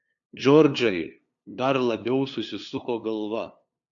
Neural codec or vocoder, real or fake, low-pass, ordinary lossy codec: codec, 16 kHz, 2 kbps, FunCodec, trained on LibriTTS, 25 frames a second; fake; 7.2 kHz; AAC, 64 kbps